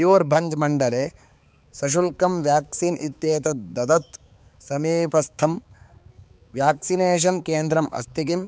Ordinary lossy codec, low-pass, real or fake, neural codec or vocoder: none; none; fake; codec, 16 kHz, 4 kbps, X-Codec, HuBERT features, trained on balanced general audio